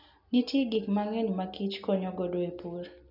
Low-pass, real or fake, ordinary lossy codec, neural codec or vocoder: 5.4 kHz; real; none; none